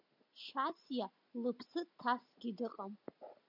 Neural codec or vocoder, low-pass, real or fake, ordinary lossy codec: none; 5.4 kHz; real; MP3, 48 kbps